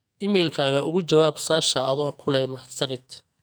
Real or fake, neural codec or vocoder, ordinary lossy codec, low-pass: fake; codec, 44.1 kHz, 2.6 kbps, SNAC; none; none